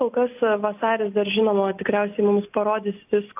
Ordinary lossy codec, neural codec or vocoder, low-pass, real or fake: AAC, 32 kbps; none; 3.6 kHz; real